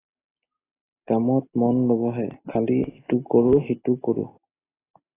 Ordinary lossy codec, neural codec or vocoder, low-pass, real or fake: AAC, 16 kbps; none; 3.6 kHz; real